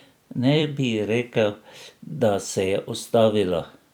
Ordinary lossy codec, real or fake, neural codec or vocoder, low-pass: none; fake; vocoder, 44.1 kHz, 128 mel bands every 256 samples, BigVGAN v2; none